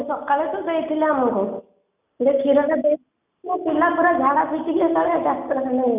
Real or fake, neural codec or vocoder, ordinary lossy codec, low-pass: real; none; none; 3.6 kHz